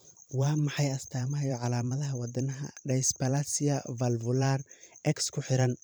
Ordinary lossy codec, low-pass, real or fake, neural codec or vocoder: none; none; fake; vocoder, 44.1 kHz, 128 mel bands every 512 samples, BigVGAN v2